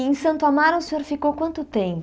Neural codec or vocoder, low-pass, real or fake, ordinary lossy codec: none; none; real; none